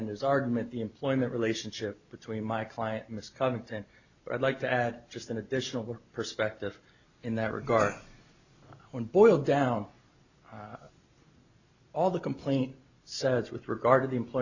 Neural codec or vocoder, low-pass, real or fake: none; 7.2 kHz; real